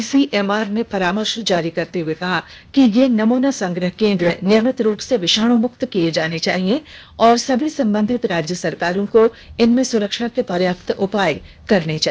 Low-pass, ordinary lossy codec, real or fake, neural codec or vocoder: none; none; fake; codec, 16 kHz, 0.8 kbps, ZipCodec